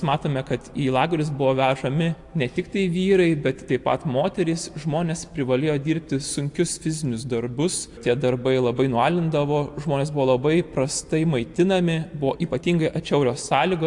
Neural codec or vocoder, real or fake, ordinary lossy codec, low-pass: none; real; AAC, 64 kbps; 10.8 kHz